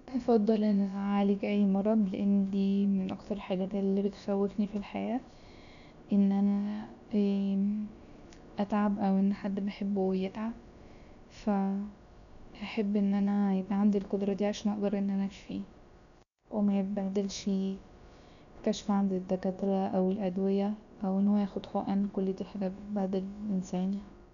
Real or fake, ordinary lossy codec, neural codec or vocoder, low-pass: fake; none; codec, 16 kHz, about 1 kbps, DyCAST, with the encoder's durations; 7.2 kHz